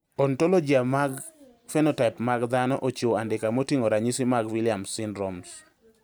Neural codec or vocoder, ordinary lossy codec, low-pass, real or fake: none; none; none; real